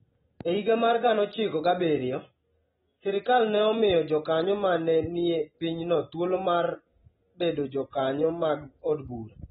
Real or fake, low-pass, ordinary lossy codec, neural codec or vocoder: real; 19.8 kHz; AAC, 16 kbps; none